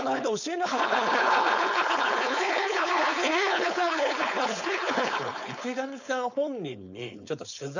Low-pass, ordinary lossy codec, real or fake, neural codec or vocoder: 7.2 kHz; none; fake; codec, 16 kHz, 4.8 kbps, FACodec